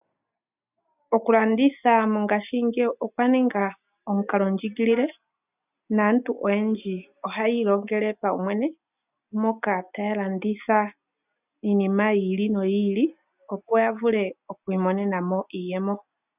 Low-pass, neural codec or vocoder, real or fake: 3.6 kHz; none; real